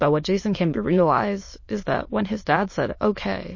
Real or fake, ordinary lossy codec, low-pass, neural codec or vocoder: fake; MP3, 32 kbps; 7.2 kHz; autoencoder, 22.05 kHz, a latent of 192 numbers a frame, VITS, trained on many speakers